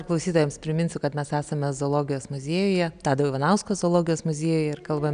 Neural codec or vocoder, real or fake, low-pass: none; real; 9.9 kHz